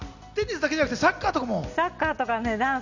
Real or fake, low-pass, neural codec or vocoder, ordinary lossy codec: real; 7.2 kHz; none; none